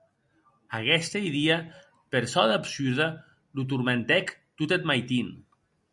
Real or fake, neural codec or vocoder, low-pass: real; none; 10.8 kHz